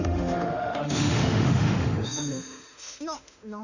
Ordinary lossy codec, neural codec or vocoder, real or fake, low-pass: none; autoencoder, 48 kHz, 32 numbers a frame, DAC-VAE, trained on Japanese speech; fake; 7.2 kHz